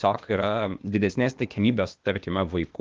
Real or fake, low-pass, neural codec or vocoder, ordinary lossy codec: fake; 7.2 kHz; codec, 16 kHz, 0.8 kbps, ZipCodec; Opus, 32 kbps